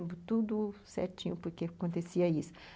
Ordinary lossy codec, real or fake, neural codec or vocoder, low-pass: none; real; none; none